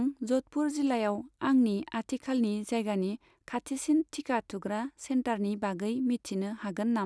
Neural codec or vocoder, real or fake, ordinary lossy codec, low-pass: none; real; none; none